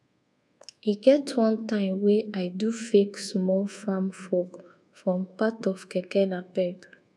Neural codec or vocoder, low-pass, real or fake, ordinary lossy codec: codec, 24 kHz, 1.2 kbps, DualCodec; none; fake; none